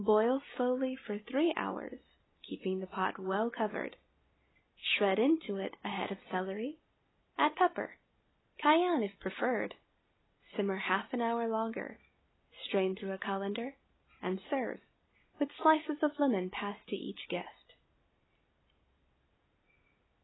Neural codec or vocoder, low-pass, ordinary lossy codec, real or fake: none; 7.2 kHz; AAC, 16 kbps; real